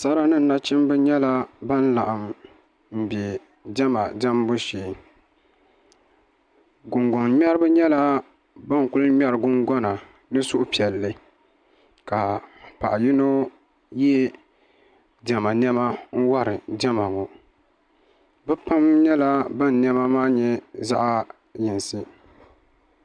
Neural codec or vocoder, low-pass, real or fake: none; 9.9 kHz; real